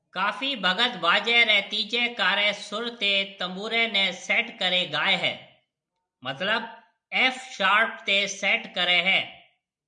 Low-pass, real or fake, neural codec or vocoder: 9.9 kHz; real; none